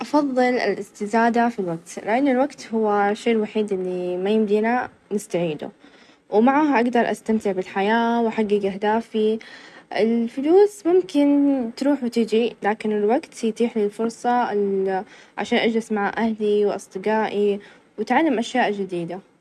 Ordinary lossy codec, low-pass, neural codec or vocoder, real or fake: none; none; none; real